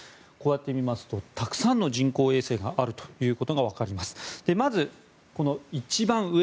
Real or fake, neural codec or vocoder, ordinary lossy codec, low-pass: real; none; none; none